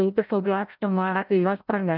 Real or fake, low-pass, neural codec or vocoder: fake; 5.4 kHz; codec, 16 kHz, 0.5 kbps, FreqCodec, larger model